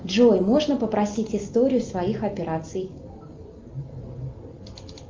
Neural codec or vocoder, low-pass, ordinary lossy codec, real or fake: none; 7.2 kHz; Opus, 24 kbps; real